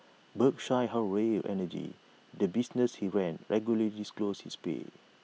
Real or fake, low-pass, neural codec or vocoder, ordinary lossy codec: real; none; none; none